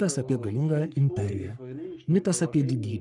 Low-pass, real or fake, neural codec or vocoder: 10.8 kHz; fake; codec, 44.1 kHz, 3.4 kbps, Pupu-Codec